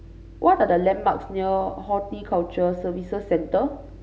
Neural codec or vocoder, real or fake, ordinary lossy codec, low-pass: none; real; none; none